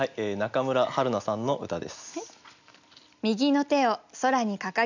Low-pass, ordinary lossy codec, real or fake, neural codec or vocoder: 7.2 kHz; none; real; none